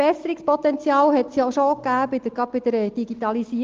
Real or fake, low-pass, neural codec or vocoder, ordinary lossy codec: real; 7.2 kHz; none; Opus, 16 kbps